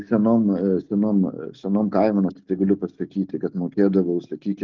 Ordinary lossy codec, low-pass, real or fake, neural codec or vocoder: Opus, 32 kbps; 7.2 kHz; real; none